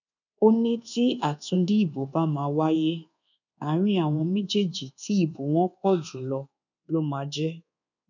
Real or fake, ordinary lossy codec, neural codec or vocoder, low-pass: fake; none; codec, 24 kHz, 1.2 kbps, DualCodec; 7.2 kHz